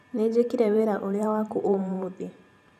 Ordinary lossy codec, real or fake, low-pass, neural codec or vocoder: none; fake; 14.4 kHz; vocoder, 44.1 kHz, 128 mel bands every 512 samples, BigVGAN v2